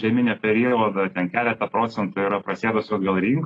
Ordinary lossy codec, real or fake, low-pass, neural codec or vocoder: AAC, 32 kbps; real; 9.9 kHz; none